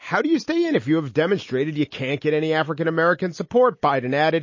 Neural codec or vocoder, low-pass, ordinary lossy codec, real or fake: none; 7.2 kHz; MP3, 32 kbps; real